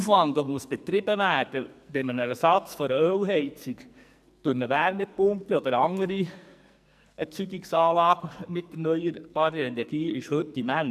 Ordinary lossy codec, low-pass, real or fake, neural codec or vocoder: none; 14.4 kHz; fake; codec, 44.1 kHz, 2.6 kbps, SNAC